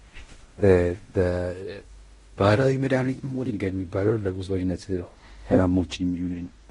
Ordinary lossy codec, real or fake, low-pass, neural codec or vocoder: AAC, 32 kbps; fake; 10.8 kHz; codec, 16 kHz in and 24 kHz out, 0.9 kbps, LongCat-Audio-Codec, fine tuned four codebook decoder